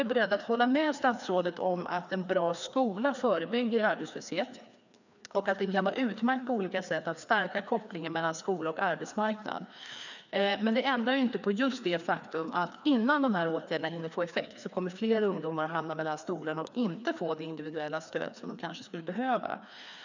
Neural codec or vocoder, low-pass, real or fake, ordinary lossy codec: codec, 16 kHz, 2 kbps, FreqCodec, larger model; 7.2 kHz; fake; none